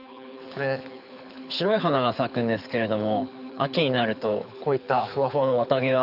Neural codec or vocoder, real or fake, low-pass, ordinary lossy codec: codec, 24 kHz, 6 kbps, HILCodec; fake; 5.4 kHz; none